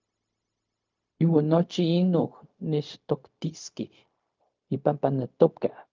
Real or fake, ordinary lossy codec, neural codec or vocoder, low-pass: fake; Opus, 24 kbps; codec, 16 kHz, 0.4 kbps, LongCat-Audio-Codec; 7.2 kHz